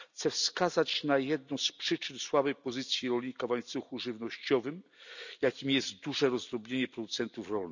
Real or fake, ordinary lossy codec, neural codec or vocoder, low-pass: real; none; none; 7.2 kHz